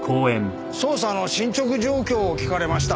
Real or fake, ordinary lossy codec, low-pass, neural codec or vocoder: real; none; none; none